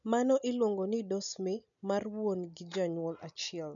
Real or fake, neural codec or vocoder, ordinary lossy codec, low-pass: real; none; MP3, 96 kbps; 7.2 kHz